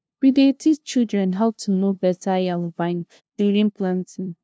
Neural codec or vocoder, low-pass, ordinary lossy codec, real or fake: codec, 16 kHz, 0.5 kbps, FunCodec, trained on LibriTTS, 25 frames a second; none; none; fake